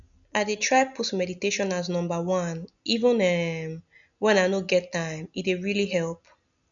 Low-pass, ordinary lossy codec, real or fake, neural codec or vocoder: 7.2 kHz; none; real; none